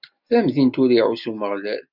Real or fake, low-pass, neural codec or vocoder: real; 5.4 kHz; none